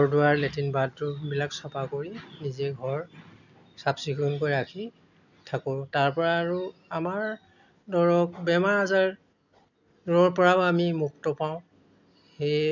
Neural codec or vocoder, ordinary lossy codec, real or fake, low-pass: none; none; real; 7.2 kHz